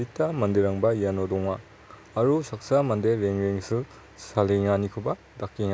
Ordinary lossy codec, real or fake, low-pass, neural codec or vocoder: none; real; none; none